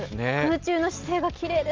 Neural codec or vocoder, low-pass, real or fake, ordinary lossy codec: none; 7.2 kHz; real; Opus, 32 kbps